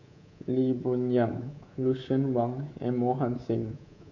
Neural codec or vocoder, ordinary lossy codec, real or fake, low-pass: codec, 24 kHz, 3.1 kbps, DualCodec; none; fake; 7.2 kHz